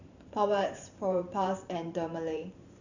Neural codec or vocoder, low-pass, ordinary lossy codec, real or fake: vocoder, 44.1 kHz, 128 mel bands every 512 samples, BigVGAN v2; 7.2 kHz; none; fake